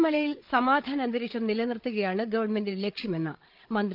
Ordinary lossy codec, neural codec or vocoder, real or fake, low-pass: Opus, 24 kbps; codec, 16 kHz, 8 kbps, FreqCodec, larger model; fake; 5.4 kHz